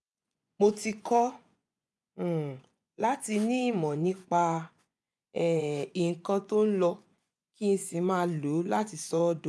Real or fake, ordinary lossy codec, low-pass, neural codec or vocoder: fake; none; none; vocoder, 24 kHz, 100 mel bands, Vocos